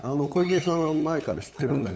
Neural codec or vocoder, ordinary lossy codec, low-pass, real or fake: codec, 16 kHz, 8 kbps, FunCodec, trained on LibriTTS, 25 frames a second; none; none; fake